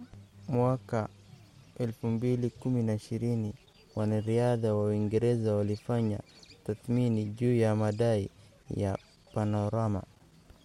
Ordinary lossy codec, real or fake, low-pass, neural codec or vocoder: MP3, 64 kbps; real; 19.8 kHz; none